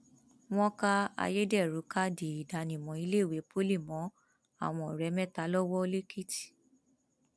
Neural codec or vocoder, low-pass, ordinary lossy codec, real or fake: none; none; none; real